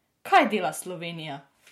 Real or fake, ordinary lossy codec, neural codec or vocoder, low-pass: real; MP3, 64 kbps; none; 19.8 kHz